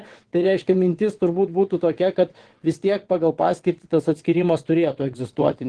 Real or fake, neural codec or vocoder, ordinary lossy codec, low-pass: fake; vocoder, 22.05 kHz, 80 mel bands, WaveNeXt; Opus, 16 kbps; 9.9 kHz